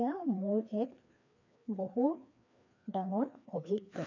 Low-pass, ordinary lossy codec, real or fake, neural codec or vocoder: 7.2 kHz; none; fake; codec, 16 kHz, 2 kbps, FreqCodec, larger model